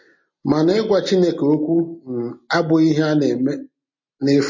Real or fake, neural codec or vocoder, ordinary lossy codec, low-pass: real; none; MP3, 32 kbps; 7.2 kHz